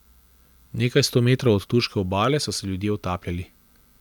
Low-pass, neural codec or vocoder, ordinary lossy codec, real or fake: 19.8 kHz; none; none; real